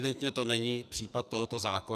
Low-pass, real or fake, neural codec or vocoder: 14.4 kHz; fake; codec, 44.1 kHz, 2.6 kbps, SNAC